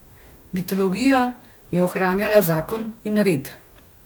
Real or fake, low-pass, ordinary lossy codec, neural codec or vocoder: fake; none; none; codec, 44.1 kHz, 2.6 kbps, DAC